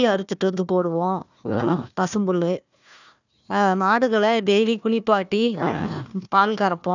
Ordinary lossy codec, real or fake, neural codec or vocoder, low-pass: none; fake; codec, 16 kHz, 1 kbps, FunCodec, trained on Chinese and English, 50 frames a second; 7.2 kHz